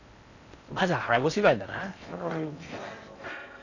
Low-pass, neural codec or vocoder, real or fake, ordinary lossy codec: 7.2 kHz; codec, 16 kHz in and 24 kHz out, 0.6 kbps, FocalCodec, streaming, 4096 codes; fake; none